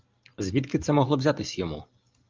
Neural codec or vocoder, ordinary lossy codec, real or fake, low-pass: none; Opus, 24 kbps; real; 7.2 kHz